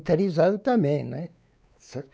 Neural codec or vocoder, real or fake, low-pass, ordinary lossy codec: codec, 16 kHz, 4 kbps, X-Codec, WavLM features, trained on Multilingual LibriSpeech; fake; none; none